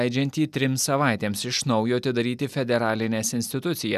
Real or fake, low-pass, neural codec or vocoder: real; 14.4 kHz; none